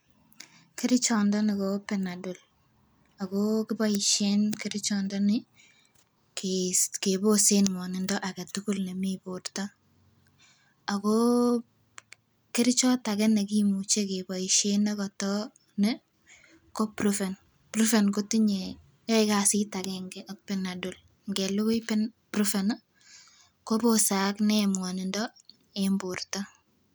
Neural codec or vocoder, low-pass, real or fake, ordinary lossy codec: none; none; real; none